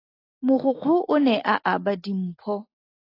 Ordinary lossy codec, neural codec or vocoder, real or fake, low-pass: AAC, 24 kbps; none; real; 5.4 kHz